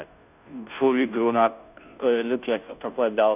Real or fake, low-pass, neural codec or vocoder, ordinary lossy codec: fake; 3.6 kHz; codec, 16 kHz, 0.5 kbps, FunCodec, trained on Chinese and English, 25 frames a second; none